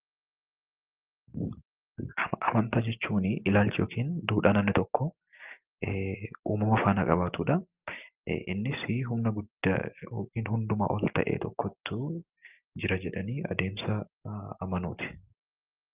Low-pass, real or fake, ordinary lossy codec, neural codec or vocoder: 3.6 kHz; real; Opus, 24 kbps; none